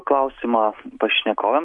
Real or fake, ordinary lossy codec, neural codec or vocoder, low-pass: real; MP3, 64 kbps; none; 10.8 kHz